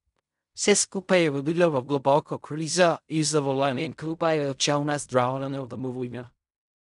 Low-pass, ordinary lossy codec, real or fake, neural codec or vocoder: 10.8 kHz; none; fake; codec, 16 kHz in and 24 kHz out, 0.4 kbps, LongCat-Audio-Codec, fine tuned four codebook decoder